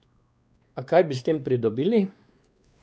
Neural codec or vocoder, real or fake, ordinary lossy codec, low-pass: codec, 16 kHz, 2 kbps, X-Codec, WavLM features, trained on Multilingual LibriSpeech; fake; none; none